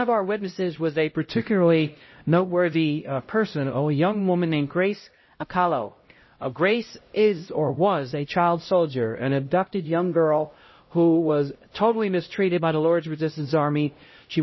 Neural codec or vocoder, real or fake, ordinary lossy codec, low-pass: codec, 16 kHz, 0.5 kbps, X-Codec, HuBERT features, trained on LibriSpeech; fake; MP3, 24 kbps; 7.2 kHz